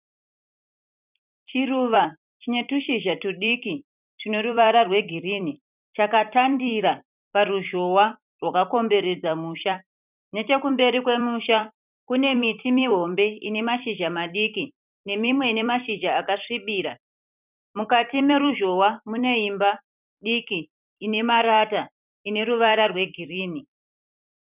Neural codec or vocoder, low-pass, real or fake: vocoder, 44.1 kHz, 128 mel bands every 256 samples, BigVGAN v2; 3.6 kHz; fake